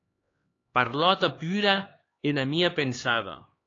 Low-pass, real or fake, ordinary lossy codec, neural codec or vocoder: 7.2 kHz; fake; AAC, 32 kbps; codec, 16 kHz, 2 kbps, X-Codec, HuBERT features, trained on LibriSpeech